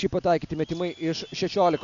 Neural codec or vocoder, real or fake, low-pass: none; real; 7.2 kHz